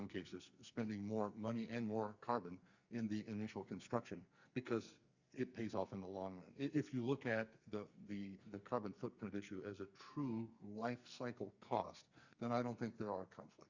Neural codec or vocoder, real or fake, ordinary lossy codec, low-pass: codec, 44.1 kHz, 2.6 kbps, SNAC; fake; Opus, 64 kbps; 7.2 kHz